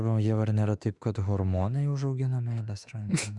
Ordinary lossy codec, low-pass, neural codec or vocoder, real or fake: Opus, 64 kbps; 10.8 kHz; autoencoder, 48 kHz, 32 numbers a frame, DAC-VAE, trained on Japanese speech; fake